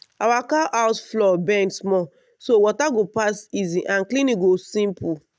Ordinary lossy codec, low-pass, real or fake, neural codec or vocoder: none; none; real; none